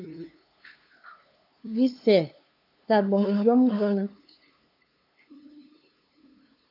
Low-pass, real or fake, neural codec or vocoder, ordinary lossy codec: 5.4 kHz; fake; codec, 16 kHz, 2 kbps, FunCodec, trained on LibriTTS, 25 frames a second; AAC, 32 kbps